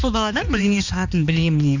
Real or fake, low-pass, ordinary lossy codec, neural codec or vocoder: fake; 7.2 kHz; none; codec, 16 kHz, 2 kbps, X-Codec, HuBERT features, trained on balanced general audio